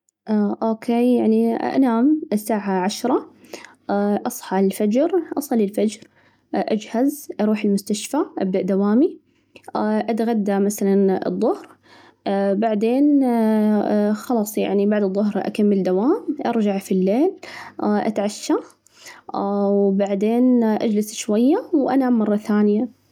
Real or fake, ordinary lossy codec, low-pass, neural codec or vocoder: real; none; 19.8 kHz; none